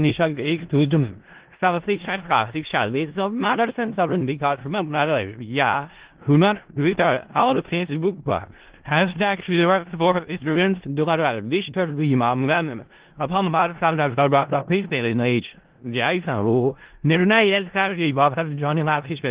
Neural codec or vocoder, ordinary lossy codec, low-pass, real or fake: codec, 16 kHz in and 24 kHz out, 0.4 kbps, LongCat-Audio-Codec, four codebook decoder; Opus, 16 kbps; 3.6 kHz; fake